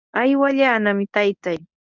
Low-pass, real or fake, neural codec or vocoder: 7.2 kHz; fake; codec, 24 kHz, 0.9 kbps, WavTokenizer, medium speech release version 1